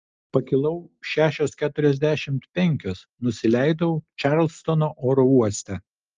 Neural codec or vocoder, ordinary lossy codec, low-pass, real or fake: none; Opus, 24 kbps; 7.2 kHz; real